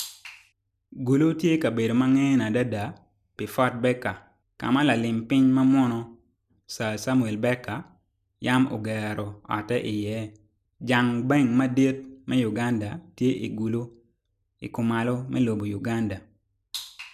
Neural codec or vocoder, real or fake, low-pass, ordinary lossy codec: none; real; 14.4 kHz; none